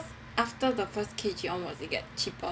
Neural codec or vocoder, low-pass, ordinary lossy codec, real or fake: none; none; none; real